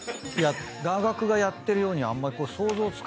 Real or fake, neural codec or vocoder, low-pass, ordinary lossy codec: real; none; none; none